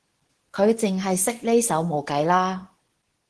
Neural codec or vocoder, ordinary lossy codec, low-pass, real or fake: codec, 24 kHz, 0.9 kbps, WavTokenizer, medium speech release version 2; Opus, 16 kbps; 10.8 kHz; fake